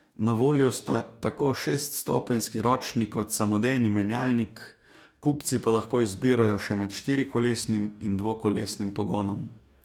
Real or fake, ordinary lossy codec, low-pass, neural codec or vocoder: fake; none; 19.8 kHz; codec, 44.1 kHz, 2.6 kbps, DAC